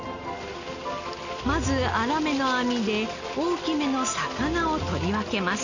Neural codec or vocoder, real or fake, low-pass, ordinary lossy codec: none; real; 7.2 kHz; none